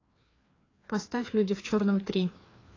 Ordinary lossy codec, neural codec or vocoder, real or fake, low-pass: AAC, 32 kbps; codec, 16 kHz, 2 kbps, FreqCodec, larger model; fake; 7.2 kHz